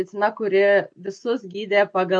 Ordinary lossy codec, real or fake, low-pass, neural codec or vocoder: MP3, 48 kbps; real; 9.9 kHz; none